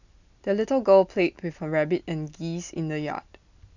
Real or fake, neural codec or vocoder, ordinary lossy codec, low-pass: real; none; none; 7.2 kHz